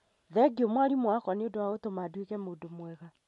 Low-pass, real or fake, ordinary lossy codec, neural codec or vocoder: 14.4 kHz; real; MP3, 48 kbps; none